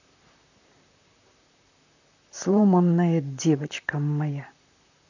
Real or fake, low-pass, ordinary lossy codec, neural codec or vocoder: fake; 7.2 kHz; none; vocoder, 44.1 kHz, 128 mel bands, Pupu-Vocoder